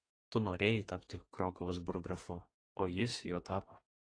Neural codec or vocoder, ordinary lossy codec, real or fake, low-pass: codec, 32 kHz, 1.9 kbps, SNAC; AAC, 32 kbps; fake; 9.9 kHz